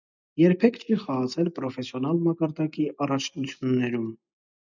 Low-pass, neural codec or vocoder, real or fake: 7.2 kHz; none; real